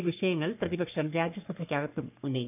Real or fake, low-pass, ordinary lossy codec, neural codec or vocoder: fake; 3.6 kHz; none; codec, 44.1 kHz, 3.4 kbps, Pupu-Codec